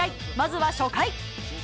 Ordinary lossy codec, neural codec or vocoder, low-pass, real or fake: none; none; none; real